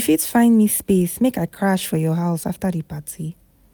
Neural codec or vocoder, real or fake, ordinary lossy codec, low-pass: none; real; none; none